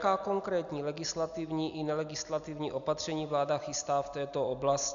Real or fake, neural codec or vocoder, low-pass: real; none; 7.2 kHz